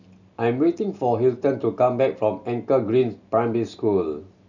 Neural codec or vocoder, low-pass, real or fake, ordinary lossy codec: none; 7.2 kHz; real; none